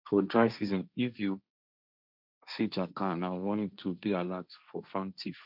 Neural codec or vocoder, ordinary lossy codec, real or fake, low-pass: codec, 16 kHz, 1.1 kbps, Voila-Tokenizer; none; fake; 5.4 kHz